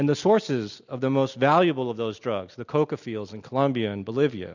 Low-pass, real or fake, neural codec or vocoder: 7.2 kHz; real; none